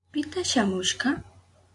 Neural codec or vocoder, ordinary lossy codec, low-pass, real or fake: none; AAC, 64 kbps; 10.8 kHz; real